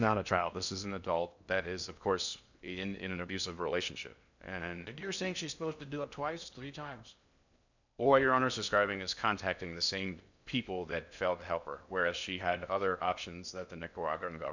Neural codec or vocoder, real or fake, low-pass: codec, 16 kHz in and 24 kHz out, 0.6 kbps, FocalCodec, streaming, 2048 codes; fake; 7.2 kHz